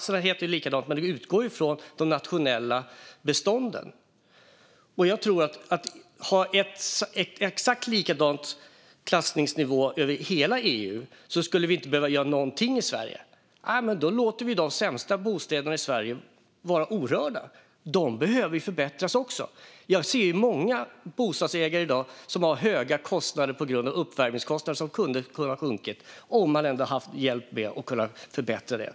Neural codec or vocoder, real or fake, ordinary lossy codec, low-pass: none; real; none; none